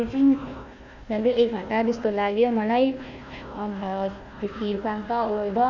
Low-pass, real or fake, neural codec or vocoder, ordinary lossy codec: 7.2 kHz; fake; codec, 16 kHz, 1 kbps, FunCodec, trained on Chinese and English, 50 frames a second; none